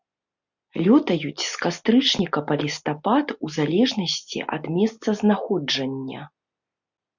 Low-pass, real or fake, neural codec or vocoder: 7.2 kHz; real; none